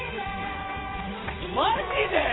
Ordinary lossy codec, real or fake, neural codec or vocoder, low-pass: AAC, 16 kbps; fake; vocoder, 22.05 kHz, 80 mel bands, WaveNeXt; 7.2 kHz